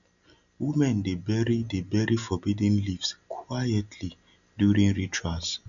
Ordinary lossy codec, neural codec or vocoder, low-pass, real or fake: none; none; 7.2 kHz; real